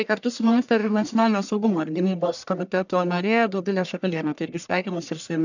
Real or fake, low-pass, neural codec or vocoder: fake; 7.2 kHz; codec, 44.1 kHz, 1.7 kbps, Pupu-Codec